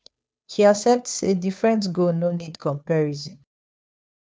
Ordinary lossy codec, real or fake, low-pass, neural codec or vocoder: none; fake; none; codec, 16 kHz, 2 kbps, FunCodec, trained on Chinese and English, 25 frames a second